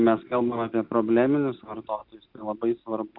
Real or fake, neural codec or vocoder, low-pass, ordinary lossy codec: real; none; 5.4 kHz; Opus, 64 kbps